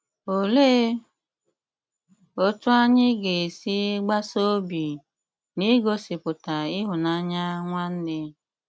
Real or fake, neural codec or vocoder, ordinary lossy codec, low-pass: real; none; none; none